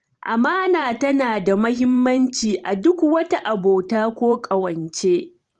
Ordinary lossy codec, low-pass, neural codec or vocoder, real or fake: Opus, 24 kbps; 10.8 kHz; vocoder, 44.1 kHz, 128 mel bands every 512 samples, BigVGAN v2; fake